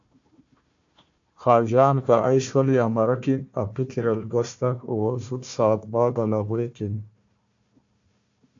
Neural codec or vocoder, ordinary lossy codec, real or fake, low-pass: codec, 16 kHz, 1 kbps, FunCodec, trained on Chinese and English, 50 frames a second; AAC, 64 kbps; fake; 7.2 kHz